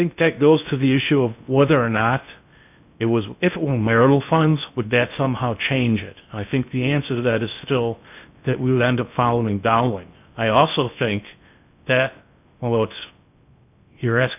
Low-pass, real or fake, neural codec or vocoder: 3.6 kHz; fake; codec, 16 kHz in and 24 kHz out, 0.6 kbps, FocalCodec, streaming, 2048 codes